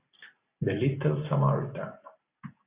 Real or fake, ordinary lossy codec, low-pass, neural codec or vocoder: real; Opus, 64 kbps; 3.6 kHz; none